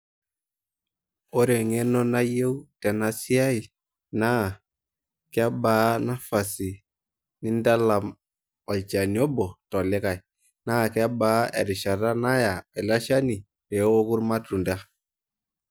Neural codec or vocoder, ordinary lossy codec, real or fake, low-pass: none; none; real; none